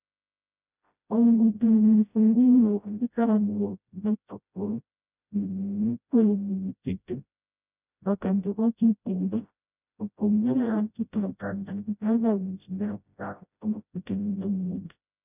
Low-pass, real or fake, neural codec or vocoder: 3.6 kHz; fake; codec, 16 kHz, 0.5 kbps, FreqCodec, smaller model